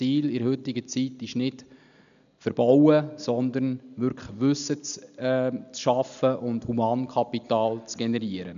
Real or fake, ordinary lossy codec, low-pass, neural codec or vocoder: real; none; 7.2 kHz; none